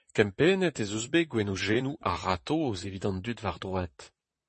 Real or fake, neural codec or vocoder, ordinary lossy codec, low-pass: fake; vocoder, 44.1 kHz, 128 mel bands, Pupu-Vocoder; MP3, 32 kbps; 10.8 kHz